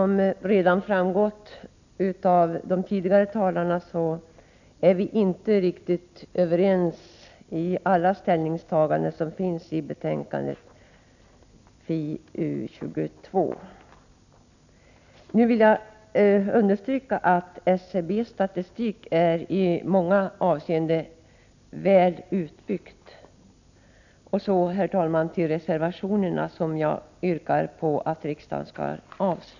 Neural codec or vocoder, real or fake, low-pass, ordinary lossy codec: none; real; 7.2 kHz; none